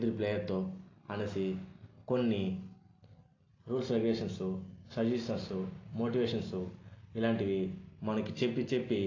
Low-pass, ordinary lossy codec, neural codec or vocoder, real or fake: 7.2 kHz; AAC, 32 kbps; none; real